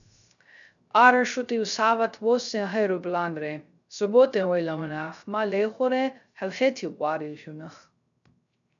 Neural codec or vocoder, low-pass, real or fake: codec, 16 kHz, 0.3 kbps, FocalCodec; 7.2 kHz; fake